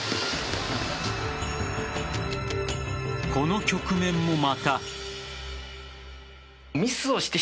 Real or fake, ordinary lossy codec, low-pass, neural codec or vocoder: real; none; none; none